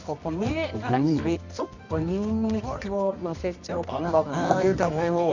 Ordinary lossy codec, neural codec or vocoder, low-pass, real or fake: none; codec, 24 kHz, 0.9 kbps, WavTokenizer, medium music audio release; 7.2 kHz; fake